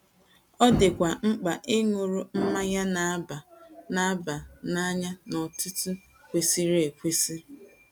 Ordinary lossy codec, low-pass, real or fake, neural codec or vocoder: none; none; real; none